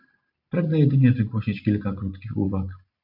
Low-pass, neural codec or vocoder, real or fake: 5.4 kHz; none; real